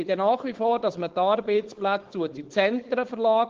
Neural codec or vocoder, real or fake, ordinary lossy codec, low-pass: codec, 16 kHz, 4.8 kbps, FACodec; fake; Opus, 16 kbps; 7.2 kHz